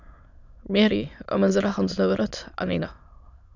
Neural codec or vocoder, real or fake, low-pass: autoencoder, 22.05 kHz, a latent of 192 numbers a frame, VITS, trained on many speakers; fake; 7.2 kHz